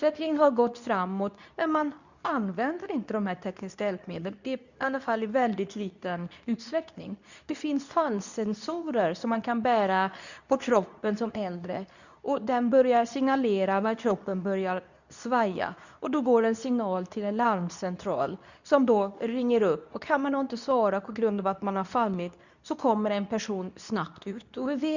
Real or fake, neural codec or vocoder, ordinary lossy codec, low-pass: fake; codec, 24 kHz, 0.9 kbps, WavTokenizer, medium speech release version 2; none; 7.2 kHz